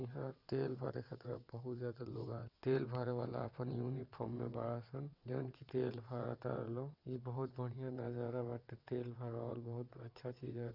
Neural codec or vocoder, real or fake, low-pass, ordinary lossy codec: vocoder, 22.05 kHz, 80 mel bands, WaveNeXt; fake; 5.4 kHz; Opus, 64 kbps